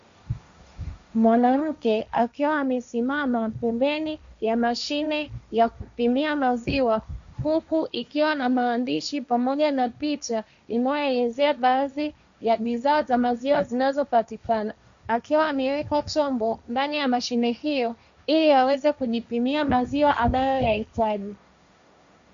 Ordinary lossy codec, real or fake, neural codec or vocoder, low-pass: MP3, 64 kbps; fake; codec, 16 kHz, 1.1 kbps, Voila-Tokenizer; 7.2 kHz